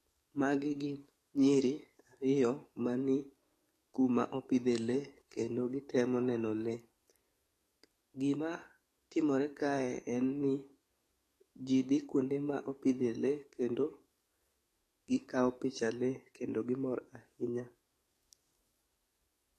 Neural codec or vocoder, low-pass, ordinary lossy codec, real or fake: codec, 44.1 kHz, 7.8 kbps, DAC; 14.4 kHz; AAC, 48 kbps; fake